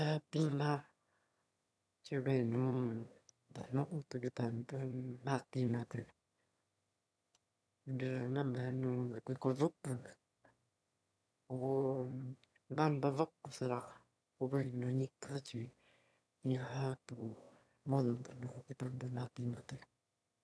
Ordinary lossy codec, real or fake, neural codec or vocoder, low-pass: none; fake; autoencoder, 22.05 kHz, a latent of 192 numbers a frame, VITS, trained on one speaker; none